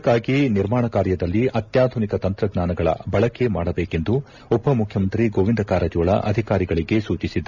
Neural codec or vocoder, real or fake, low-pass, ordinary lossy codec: none; real; 7.2 kHz; none